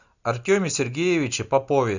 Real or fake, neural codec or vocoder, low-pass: real; none; 7.2 kHz